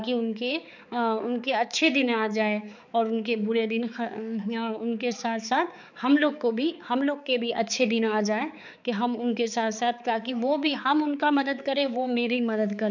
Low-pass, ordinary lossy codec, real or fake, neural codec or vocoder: 7.2 kHz; none; fake; codec, 16 kHz, 4 kbps, X-Codec, HuBERT features, trained on balanced general audio